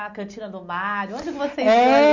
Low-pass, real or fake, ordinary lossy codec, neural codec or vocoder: 7.2 kHz; real; none; none